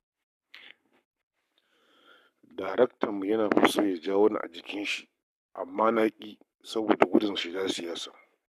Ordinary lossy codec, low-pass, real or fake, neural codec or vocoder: none; 14.4 kHz; fake; codec, 44.1 kHz, 7.8 kbps, Pupu-Codec